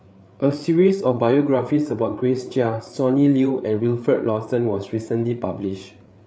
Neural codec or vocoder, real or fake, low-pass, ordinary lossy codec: codec, 16 kHz, 8 kbps, FreqCodec, larger model; fake; none; none